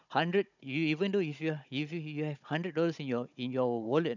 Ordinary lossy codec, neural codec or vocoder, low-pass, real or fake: none; codec, 16 kHz, 16 kbps, FunCodec, trained on Chinese and English, 50 frames a second; 7.2 kHz; fake